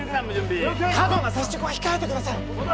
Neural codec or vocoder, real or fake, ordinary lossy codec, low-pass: none; real; none; none